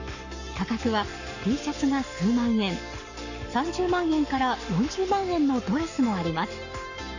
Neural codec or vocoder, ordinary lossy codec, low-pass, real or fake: codec, 44.1 kHz, 7.8 kbps, Pupu-Codec; none; 7.2 kHz; fake